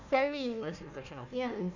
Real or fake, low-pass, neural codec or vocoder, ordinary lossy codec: fake; 7.2 kHz; codec, 16 kHz, 1 kbps, FunCodec, trained on Chinese and English, 50 frames a second; none